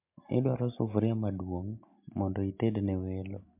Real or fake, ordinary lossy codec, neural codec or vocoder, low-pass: real; MP3, 32 kbps; none; 3.6 kHz